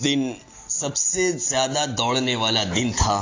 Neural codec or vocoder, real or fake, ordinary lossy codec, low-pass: none; real; AAC, 32 kbps; 7.2 kHz